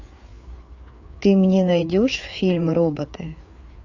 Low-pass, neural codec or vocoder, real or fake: 7.2 kHz; codec, 16 kHz, 4 kbps, FreqCodec, larger model; fake